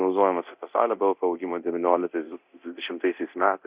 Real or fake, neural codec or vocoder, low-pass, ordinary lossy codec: fake; codec, 24 kHz, 0.9 kbps, DualCodec; 3.6 kHz; Opus, 64 kbps